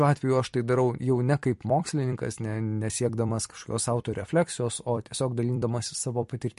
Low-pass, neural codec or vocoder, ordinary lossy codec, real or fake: 14.4 kHz; vocoder, 44.1 kHz, 128 mel bands every 256 samples, BigVGAN v2; MP3, 48 kbps; fake